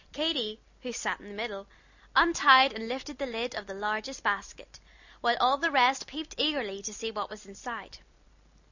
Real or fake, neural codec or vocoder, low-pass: real; none; 7.2 kHz